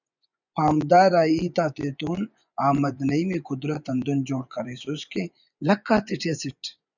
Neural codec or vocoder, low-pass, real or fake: vocoder, 24 kHz, 100 mel bands, Vocos; 7.2 kHz; fake